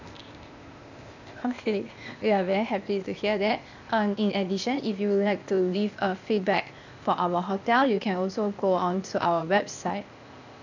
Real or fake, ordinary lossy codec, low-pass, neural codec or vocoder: fake; none; 7.2 kHz; codec, 16 kHz, 0.8 kbps, ZipCodec